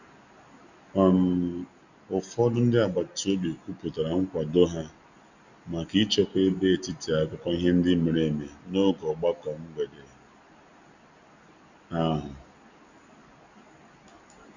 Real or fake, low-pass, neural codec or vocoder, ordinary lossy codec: real; 7.2 kHz; none; none